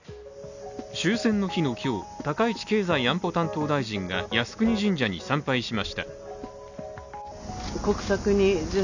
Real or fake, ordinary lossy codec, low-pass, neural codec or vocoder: real; none; 7.2 kHz; none